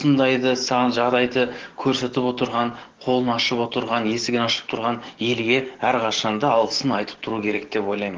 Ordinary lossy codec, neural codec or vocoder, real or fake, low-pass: Opus, 16 kbps; codec, 44.1 kHz, 7.8 kbps, DAC; fake; 7.2 kHz